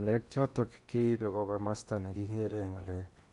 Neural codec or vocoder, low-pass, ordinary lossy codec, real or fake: codec, 16 kHz in and 24 kHz out, 0.8 kbps, FocalCodec, streaming, 65536 codes; 10.8 kHz; none; fake